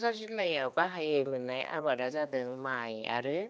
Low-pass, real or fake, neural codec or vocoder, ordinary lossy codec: none; fake; codec, 16 kHz, 2 kbps, X-Codec, HuBERT features, trained on general audio; none